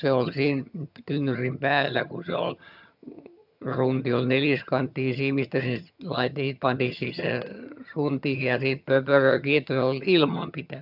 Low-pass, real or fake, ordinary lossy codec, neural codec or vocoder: 5.4 kHz; fake; none; vocoder, 22.05 kHz, 80 mel bands, HiFi-GAN